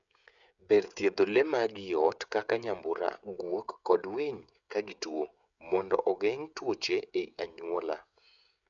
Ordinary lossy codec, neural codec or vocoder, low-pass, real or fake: none; codec, 16 kHz, 16 kbps, FreqCodec, smaller model; 7.2 kHz; fake